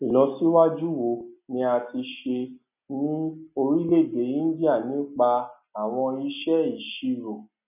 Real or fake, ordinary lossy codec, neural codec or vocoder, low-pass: real; none; none; 3.6 kHz